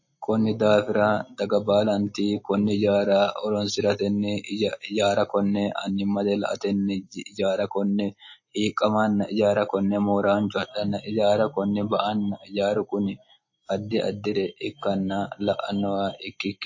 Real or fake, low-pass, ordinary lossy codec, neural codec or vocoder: real; 7.2 kHz; MP3, 32 kbps; none